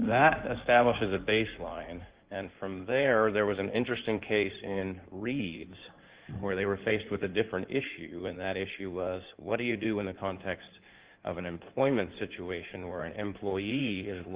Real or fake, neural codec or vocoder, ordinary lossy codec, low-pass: fake; codec, 16 kHz in and 24 kHz out, 2.2 kbps, FireRedTTS-2 codec; Opus, 32 kbps; 3.6 kHz